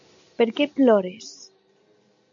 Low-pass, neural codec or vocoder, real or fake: 7.2 kHz; none; real